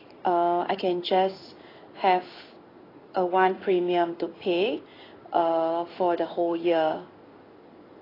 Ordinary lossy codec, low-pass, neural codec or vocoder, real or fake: AAC, 24 kbps; 5.4 kHz; codec, 16 kHz in and 24 kHz out, 1 kbps, XY-Tokenizer; fake